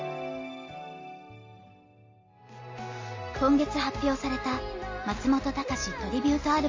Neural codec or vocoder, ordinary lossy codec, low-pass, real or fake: none; MP3, 32 kbps; 7.2 kHz; real